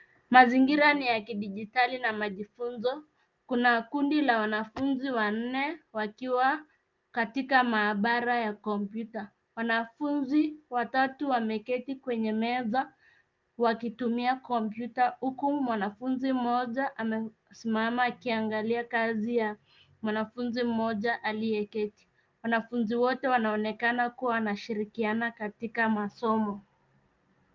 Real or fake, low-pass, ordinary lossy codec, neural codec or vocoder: real; 7.2 kHz; Opus, 24 kbps; none